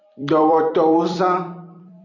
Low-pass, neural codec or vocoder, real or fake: 7.2 kHz; vocoder, 44.1 kHz, 128 mel bands every 256 samples, BigVGAN v2; fake